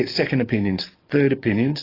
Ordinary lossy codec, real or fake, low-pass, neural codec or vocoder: AAC, 24 kbps; fake; 5.4 kHz; codec, 44.1 kHz, 7.8 kbps, DAC